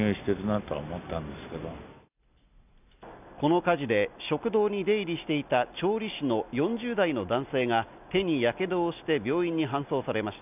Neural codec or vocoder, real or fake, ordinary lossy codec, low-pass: none; real; none; 3.6 kHz